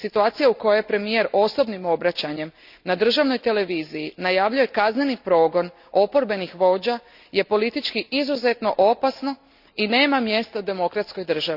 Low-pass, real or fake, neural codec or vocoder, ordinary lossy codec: 5.4 kHz; real; none; none